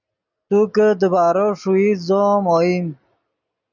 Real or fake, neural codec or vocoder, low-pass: real; none; 7.2 kHz